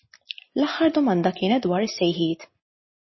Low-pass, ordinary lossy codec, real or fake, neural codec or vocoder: 7.2 kHz; MP3, 24 kbps; real; none